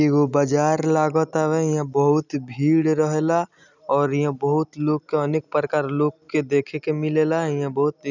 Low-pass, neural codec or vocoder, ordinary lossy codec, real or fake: 7.2 kHz; none; none; real